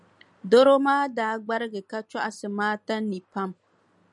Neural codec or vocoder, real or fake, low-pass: none; real; 10.8 kHz